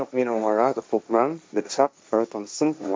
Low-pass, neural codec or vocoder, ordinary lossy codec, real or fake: none; codec, 16 kHz, 1.1 kbps, Voila-Tokenizer; none; fake